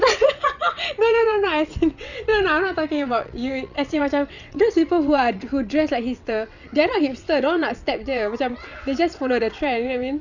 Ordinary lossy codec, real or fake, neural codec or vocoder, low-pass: none; fake; vocoder, 22.05 kHz, 80 mel bands, WaveNeXt; 7.2 kHz